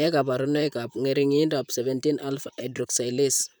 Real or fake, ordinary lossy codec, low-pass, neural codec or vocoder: fake; none; none; vocoder, 44.1 kHz, 128 mel bands, Pupu-Vocoder